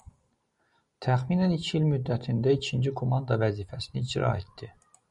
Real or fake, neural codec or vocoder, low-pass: real; none; 10.8 kHz